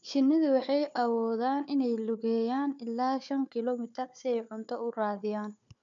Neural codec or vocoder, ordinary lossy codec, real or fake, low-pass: codec, 16 kHz, 4 kbps, FunCodec, trained on Chinese and English, 50 frames a second; MP3, 64 kbps; fake; 7.2 kHz